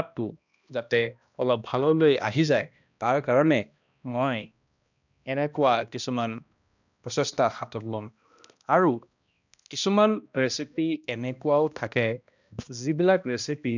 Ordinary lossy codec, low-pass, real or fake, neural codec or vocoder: none; 7.2 kHz; fake; codec, 16 kHz, 1 kbps, X-Codec, HuBERT features, trained on balanced general audio